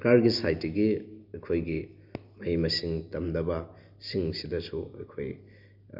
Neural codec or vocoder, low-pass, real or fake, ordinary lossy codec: none; 5.4 kHz; real; none